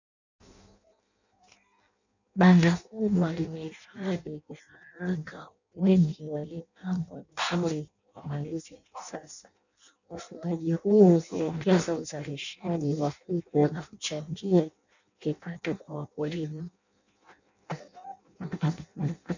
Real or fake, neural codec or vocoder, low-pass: fake; codec, 16 kHz in and 24 kHz out, 0.6 kbps, FireRedTTS-2 codec; 7.2 kHz